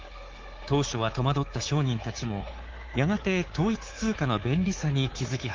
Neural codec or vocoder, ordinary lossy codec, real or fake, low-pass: codec, 24 kHz, 3.1 kbps, DualCodec; Opus, 16 kbps; fake; 7.2 kHz